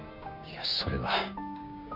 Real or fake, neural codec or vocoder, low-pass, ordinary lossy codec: fake; codec, 16 kHz, 6 kbps, DAC; 5.4 kHz; none